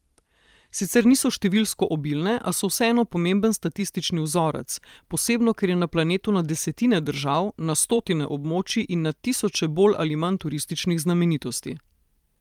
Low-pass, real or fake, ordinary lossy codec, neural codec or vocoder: 19.8 kHz; fake; Opus, 32 kbps; vocoder, 44.1 kHz, 128 mel bands every 512 samples, BigVGAN v2